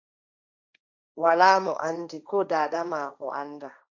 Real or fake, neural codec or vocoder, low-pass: fake; codec, 16 kHz, 1.1 kbps, Voila-Tokenizer; 7.2 kHz